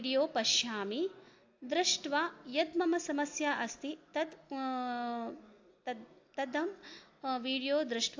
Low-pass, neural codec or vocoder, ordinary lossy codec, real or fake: 7.2 kHz; none; AAC, 48 kbps; real